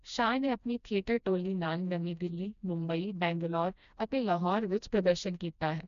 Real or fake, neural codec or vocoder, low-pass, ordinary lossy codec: fake; codec, 16 kHz, 1 kbps, FreqCodec, smaller model; 7.2 kHz; none